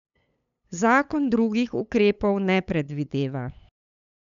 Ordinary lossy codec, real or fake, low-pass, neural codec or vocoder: none; fake; 7.2 kHz; codec, 16 kHz, 8 kbps, FunCodec, trained on LibriTTS, 25 frames a second